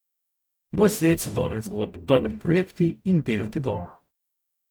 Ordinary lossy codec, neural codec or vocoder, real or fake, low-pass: none; codec, 44.1 kHz, 0.9 kbps, DAC; fake; none